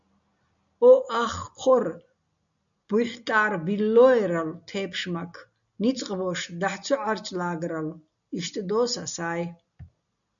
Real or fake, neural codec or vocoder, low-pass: real; none; 7.2 kHz